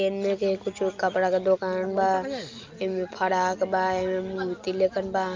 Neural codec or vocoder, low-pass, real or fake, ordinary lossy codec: none; 7.2 kHz; real; Opus, 24 kbps